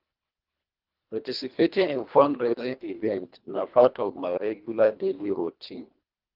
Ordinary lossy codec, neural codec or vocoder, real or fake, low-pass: Opus, 32 kbps; codec, 24 kHz, 1.5 kbps, HILCodec; fake; 5.4 kHz